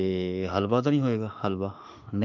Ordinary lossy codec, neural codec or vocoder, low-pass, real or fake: none; autoencoder, 48 kHz, 32 numbers a frame, DAC-VAE, trained on Japanese speech; 7.2 kHz; fake